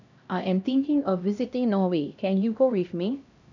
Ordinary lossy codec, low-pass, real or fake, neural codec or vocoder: none; 7.2 kHz; fake; codec, 16 kHz, 1 kbps, X-Codec, HuBERT features, trained on LibriSpeech